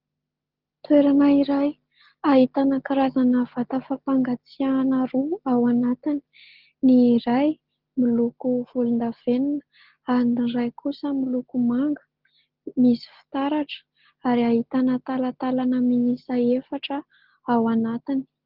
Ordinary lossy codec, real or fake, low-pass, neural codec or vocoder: Opus, 16 kbps; real; 5.4 kHz; none